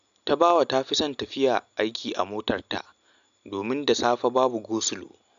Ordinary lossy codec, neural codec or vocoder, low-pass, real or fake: none; none; 7.2 kHz; real